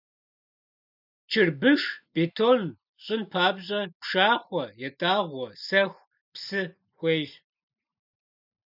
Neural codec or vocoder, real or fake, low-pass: none; real; 5.4 kHz